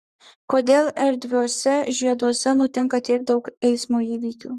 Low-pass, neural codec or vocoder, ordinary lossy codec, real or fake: 14.4 kHz; codec, 44.1 kHz, 3.4 kbps, Pupu-Codec; Opus, 64 kbps; fake